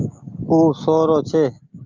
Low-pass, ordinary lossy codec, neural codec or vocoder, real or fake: 7.2 kHz; Opus, 24 kbps; none; real